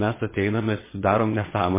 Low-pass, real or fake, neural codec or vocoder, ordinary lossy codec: 3.6 kHz; fake; vocoder, 22.05 kHz, 80 mel bands, Vocos; MP3, 16 kbps